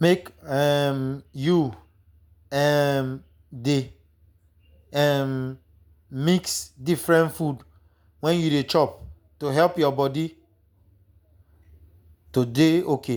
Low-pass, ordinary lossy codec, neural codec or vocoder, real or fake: none; none; none; real